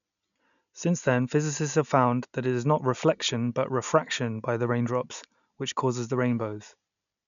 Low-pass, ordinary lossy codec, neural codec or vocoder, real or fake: 7.2 kHz; none; none; real